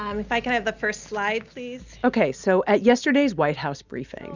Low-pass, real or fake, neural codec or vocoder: 7.2 kHz; real; none